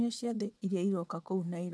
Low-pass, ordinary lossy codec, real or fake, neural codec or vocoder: none; none; fake; vocoder, 22.05 kHz, 80 mel bands, WaveNeXt